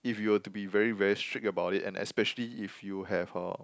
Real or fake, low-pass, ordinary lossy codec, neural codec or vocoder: real; none; none; none